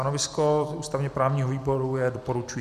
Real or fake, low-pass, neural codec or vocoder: fake; 14.4 kHz; vocoder, 44.1 kHz, 128 mel bands every 256 samples, BigVGAN v2